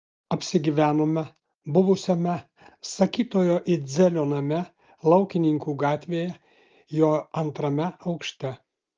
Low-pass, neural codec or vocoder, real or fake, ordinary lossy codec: 7.2 kHz; none; real; Opus, 24 kbps